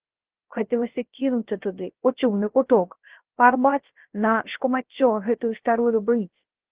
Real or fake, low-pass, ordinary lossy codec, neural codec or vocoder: fake; 3.6 kHz; Opus, 16 kbps; codec, 16 kHz, 0.3 kbps, FocalCodec